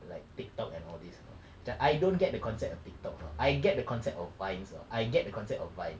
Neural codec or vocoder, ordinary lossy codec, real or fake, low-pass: none; none; real; none